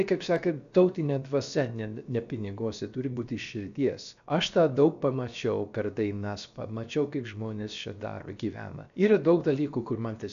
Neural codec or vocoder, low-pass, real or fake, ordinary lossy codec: codec, 16 kHz, about 1 kbps, DyCAST, with the encoder's durations; 7.2 kHz; fake; AAC, 96 kbps